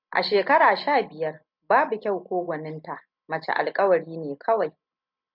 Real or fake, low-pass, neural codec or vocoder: real; 5.4 kHz; none